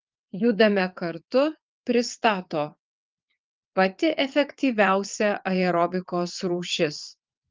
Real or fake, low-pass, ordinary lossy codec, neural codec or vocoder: fake; 7.2 kHz; Opus, 32 kbps; vocoder, 22.05 kHz, 80 mel bands, WaveNeXt